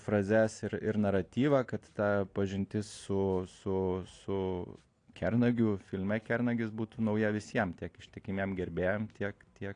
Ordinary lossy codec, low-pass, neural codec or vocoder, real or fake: AAC, 48 kbps; 9.9 kHz; none; real